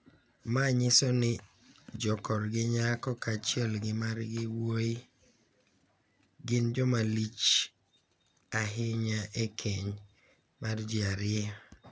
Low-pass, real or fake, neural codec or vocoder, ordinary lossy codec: none; real; none; none